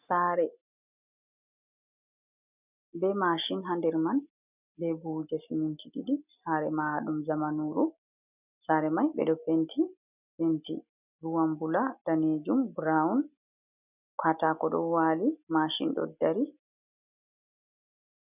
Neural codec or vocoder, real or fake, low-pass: none; real; 3.6 kHz